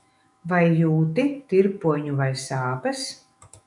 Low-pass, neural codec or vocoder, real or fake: 10.8 kHz; autoencoder, 48 kHz, 128 numbers a frame, DAC-VAE, trained on Japanese speech; fake